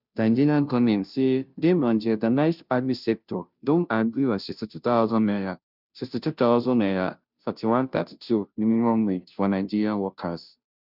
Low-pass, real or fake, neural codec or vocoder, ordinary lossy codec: 5.4 kHz; fake; codec, 16 kHz, 0.5 kbps, FunCodec, trained on Chinese and English, 25 frames a second; none